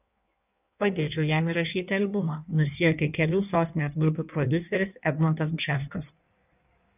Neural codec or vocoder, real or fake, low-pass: codec, 16 kHz in and 24 kHz out, 1.1 kbps, FireRedTTS-2 codec; fake; 3.6 kHz